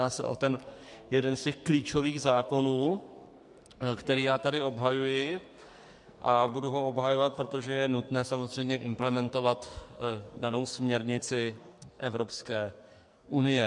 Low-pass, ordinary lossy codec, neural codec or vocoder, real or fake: 10.8 kHz; MP3, 64 kbps; codec, 44.1 kHz, 2.6 kbps, SNAC; fake